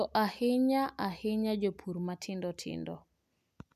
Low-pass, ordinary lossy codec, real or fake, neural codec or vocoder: 14.4 kHz; none; real; none